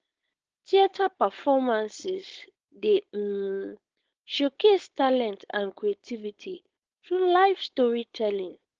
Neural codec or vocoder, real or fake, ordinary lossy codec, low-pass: codec, 16 kHz, 4.8 kbps, FACodec; fake; Opus, 16 kbps; 7.2 kHz